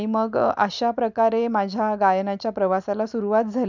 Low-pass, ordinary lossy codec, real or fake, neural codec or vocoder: 7.2 kHz; none; real; none